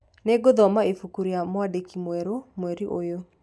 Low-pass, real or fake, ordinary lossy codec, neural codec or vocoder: none; real; none; none